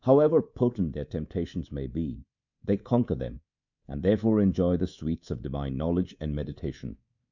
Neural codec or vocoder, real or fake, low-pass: codec, 24 kHz, 3.1 kbps, DualCodec; fake; 7.2 kHz